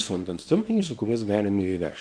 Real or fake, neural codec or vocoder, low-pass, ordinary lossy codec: fake; codec, 24 kHz, 0.9 kbps, WavTokenizer, small release; 9.9 kHz; MP3, 64 kbps